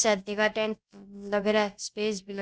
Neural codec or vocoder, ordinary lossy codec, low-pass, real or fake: codec, 16 kHz, about 1 kbps, DyCAST, with the encoder's durations; none; none; fake